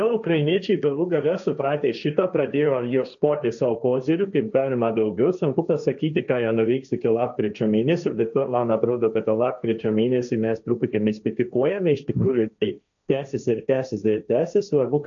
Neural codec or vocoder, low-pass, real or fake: codec, 16 kHz, 1.1 kbps, Voila-Tokenizer; 7.2 kHz; fake